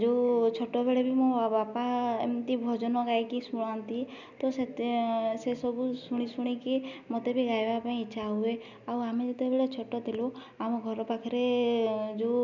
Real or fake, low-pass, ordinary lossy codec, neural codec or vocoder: real; 7.2 kHz; none; none